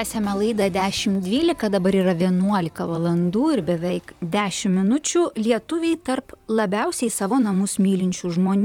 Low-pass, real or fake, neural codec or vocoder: 19.8 kHz; fake; vocoder, 44.1 kHz, 128 mel bands, Pupu-Vocoder